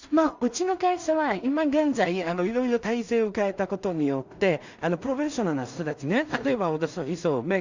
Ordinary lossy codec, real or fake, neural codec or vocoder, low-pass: Opus, 64 kbps; fake; codec, 16 kHz in and 24 kHz out, 0.4 kbps, LongCat-Audio-Codec, two codebook decoder; 7.2 kHz